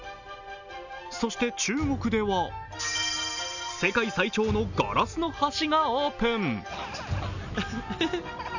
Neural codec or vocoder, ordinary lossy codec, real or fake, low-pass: none; none; real; 7.2 kHz